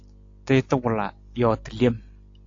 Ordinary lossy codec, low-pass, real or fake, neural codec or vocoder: AAC, 48 kbps; 7.2 kHz; real; none